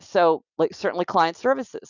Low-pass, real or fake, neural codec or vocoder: 7.2 kHz; real; none